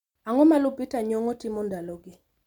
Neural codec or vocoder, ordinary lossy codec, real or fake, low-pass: none; MP3, 96 kbps; real; 19.8 kHz